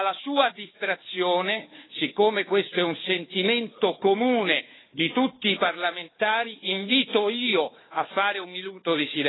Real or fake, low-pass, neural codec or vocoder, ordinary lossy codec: fake; 7.2 kHz; codec, 16 kHz, 4 kbps, FunCodec, trained on Chinese and English, 50 frames a second; AAC, 16 kbps